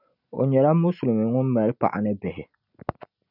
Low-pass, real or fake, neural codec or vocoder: 5.4 kHz; real; none